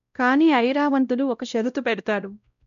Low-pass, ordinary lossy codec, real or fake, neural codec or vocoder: 7.2 kHz; none; fake; codec, 16 kHz, 0.5 kbps, X-Codec, WavLM features, trained on Multilingual LibriSpeech